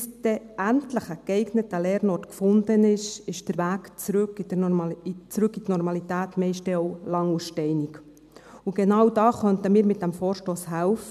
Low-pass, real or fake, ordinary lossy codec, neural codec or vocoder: 14.4 kHz; real; none; none